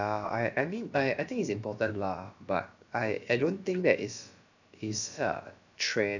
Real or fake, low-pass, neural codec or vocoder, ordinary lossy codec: fake; 7.2 kHz; codec, 16 kHz, about 1 kbps, DyCAST, with the encoder's durations; none